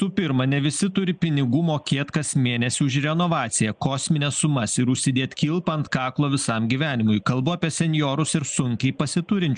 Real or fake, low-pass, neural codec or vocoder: real; 9.9 kHz; none